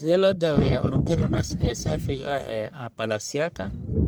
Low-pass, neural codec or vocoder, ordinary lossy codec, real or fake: none; codec, 44.1 kHz, 1.7 kbps, Pupu-Codec; none; fake